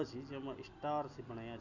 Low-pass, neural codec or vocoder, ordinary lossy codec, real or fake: 7.2 kHz; none; none; real